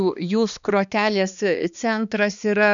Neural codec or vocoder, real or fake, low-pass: codec, 16 kHz, 2 kbps, X-Codec, HuBERT features, trained on balanced general audio; fake; 7.2 kHz